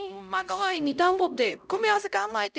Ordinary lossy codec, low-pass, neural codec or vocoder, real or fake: none; none; codec, 16 kHz, 0.5 kbps, X-Codec, HuBERT features, trained on LibriSpeech; fake